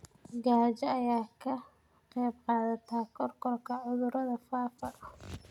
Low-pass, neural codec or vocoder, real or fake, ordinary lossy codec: 19.8 kHz; none; real; none